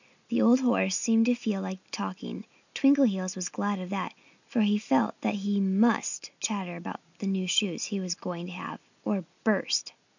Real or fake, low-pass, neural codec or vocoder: real; 7.2 kHz; none